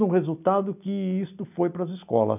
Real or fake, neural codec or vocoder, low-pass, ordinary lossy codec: real; none; 3.6 kHz; none